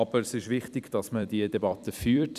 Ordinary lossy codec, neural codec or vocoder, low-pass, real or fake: none; vocoder, 44.1 kHz, 128 mel bands every 256 samples, BigVGAN v2; 14.4 kHz; fake